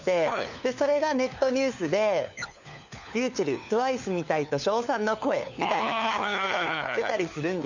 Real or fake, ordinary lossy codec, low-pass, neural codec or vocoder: fake; none; 7.2 kHz; codec, 16 kHz, 4 kbps, FunCodec, trained on LibriTTS, 50 frames a second